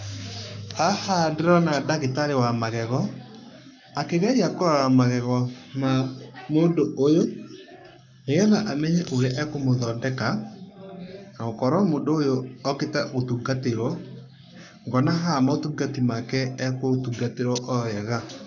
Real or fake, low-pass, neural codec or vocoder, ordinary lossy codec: fake; 7.2 kHz; codec, 44.1 kHz, 7.8 kbps, Pupu-Codec; none